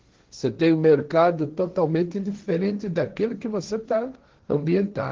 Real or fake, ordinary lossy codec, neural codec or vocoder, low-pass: fake; Opus, 16 kbps; codec, 16 kHz, 1.1 kbps, Voila-Tokenizer; 7.2 kHz